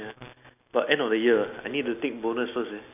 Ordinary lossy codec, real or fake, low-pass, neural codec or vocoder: none; real; 3.6 kHz; none